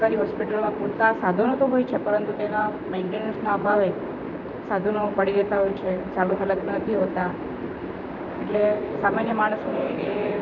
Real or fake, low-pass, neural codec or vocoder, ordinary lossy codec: fake; 7.2 kHz; vocoder, 44.1 kHz, 128 mel bands, Pupu-Vocoder; none